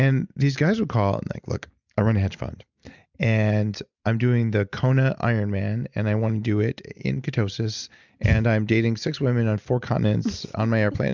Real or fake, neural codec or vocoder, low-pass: real; none; 7.2 kHz